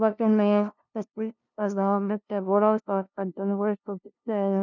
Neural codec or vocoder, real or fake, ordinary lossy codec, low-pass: codec, 16 kHz, 0.5 kbps, FunCodec, trained on LibriTTS, 25 frames a second; fake; none; 7.2 kHz